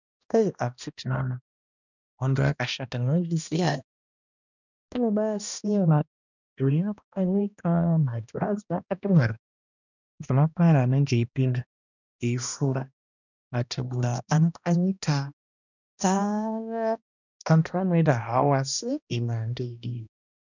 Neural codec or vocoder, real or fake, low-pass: codec, 16 kHz, 1 kbps, X-Codec, HuBERT features, trained on balanced general audio; fake; 7.2 kHz